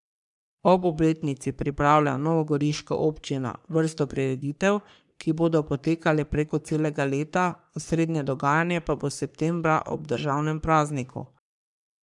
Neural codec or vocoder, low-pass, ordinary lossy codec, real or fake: codec, 44.1 kHz, 3.4 kbps, Pupu-Codec; 10.8 kHz; none; fake